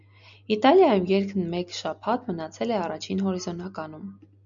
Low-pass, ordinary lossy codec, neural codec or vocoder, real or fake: 7.2 kHz; MP3, 64 kbps; none; real